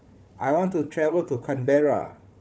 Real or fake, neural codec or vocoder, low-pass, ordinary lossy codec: fake; codec, 16 kHz, 4 kbps, FunCodec, trained on Chinese and English, 50 frames a second; none; none